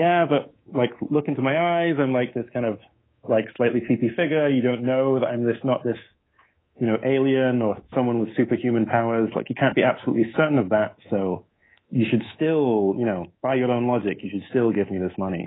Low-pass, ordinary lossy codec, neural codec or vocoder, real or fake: 7.2 kHz; AAC, 16 kbps; codec, 24 kHz, 3.1 kbps, DualCodec; fake